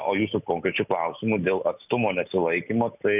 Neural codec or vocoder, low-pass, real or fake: none; 3.6 kHz; real